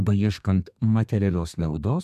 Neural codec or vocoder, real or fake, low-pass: codec, 32 kHz, 1.9 kbps, SNAC; fake; 14.4 kHz